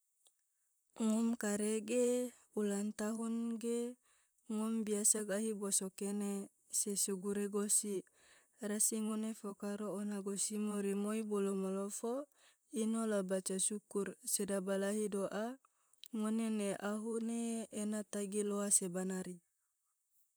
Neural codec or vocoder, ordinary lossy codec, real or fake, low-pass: vocoder, 44.1 kHz, 128 mel bands, Pupu-Vocoder; none; fake; none